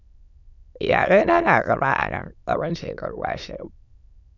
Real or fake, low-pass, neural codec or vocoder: fake; 7.2 kHz; autoencoder, 22.05 kHz, a latent of 192 numbers a frame, VITS, trained on many speakers